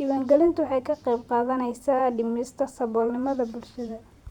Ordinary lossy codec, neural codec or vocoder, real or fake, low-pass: Opus, 64 kbps; vocoder, 44.1 kHz, 128 mel bands every 512 samples, BigVGAN v2; fake; 19.8 kHz